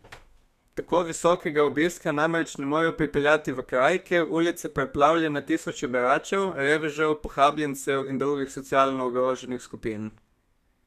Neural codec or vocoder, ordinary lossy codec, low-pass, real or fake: codec, 32 kHz, 1.9 kbps, SNAC; none; 14.4 kHz; fake